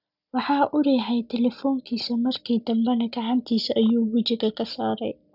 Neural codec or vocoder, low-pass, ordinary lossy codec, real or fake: none; 5.4 kHz; none; real